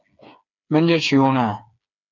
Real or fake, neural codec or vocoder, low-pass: fake; codec, 16 kHz, 4 kbps, FreqCodec, smaller model; 7.2 kHz